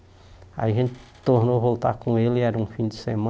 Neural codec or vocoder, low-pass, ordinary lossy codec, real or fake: none; none; none; real